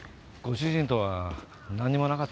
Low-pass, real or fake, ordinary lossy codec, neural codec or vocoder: none; real; none; none